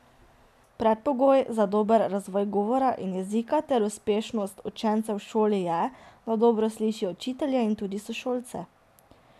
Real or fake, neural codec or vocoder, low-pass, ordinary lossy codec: real; none; 14.4 kHz; none